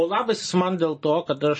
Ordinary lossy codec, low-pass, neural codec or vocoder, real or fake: MP3, 32 kbps; 9.9 kHz; none; real